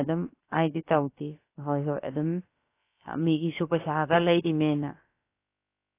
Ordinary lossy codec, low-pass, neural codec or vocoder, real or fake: AAC, 24 kbps; 3.6 kHz; codec, 16 kHz, about 1 kbps, DyCAST, with the encoder's durations; fake